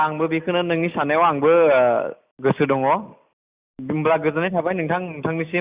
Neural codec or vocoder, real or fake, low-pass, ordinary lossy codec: none; real; 3.6 kHz; Opus, 64 kbps